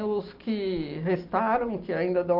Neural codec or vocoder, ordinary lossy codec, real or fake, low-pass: none; Opus, 32 kbps; real; 5.4 kHz